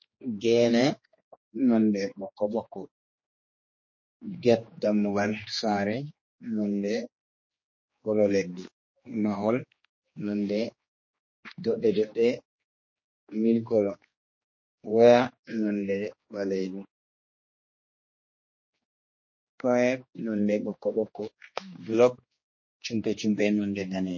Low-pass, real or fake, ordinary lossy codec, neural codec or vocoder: 7.2 kHz; fake; MP3, 32 kbps; codec, 16 kHz, 2 kbps, X-Codec, HuBERT features, trained on general audio